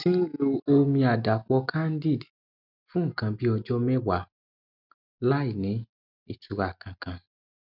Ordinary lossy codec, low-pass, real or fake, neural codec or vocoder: none; 5.4 kHz; real; none